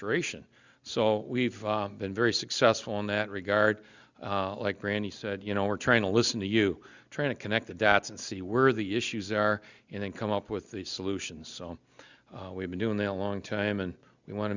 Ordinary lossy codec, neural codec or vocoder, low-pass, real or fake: Opus, 64 kbps; none; 7.2 kHz; real